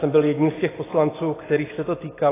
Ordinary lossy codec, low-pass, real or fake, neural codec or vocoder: AAC, 16 kbps; 3.6 kHz; real; none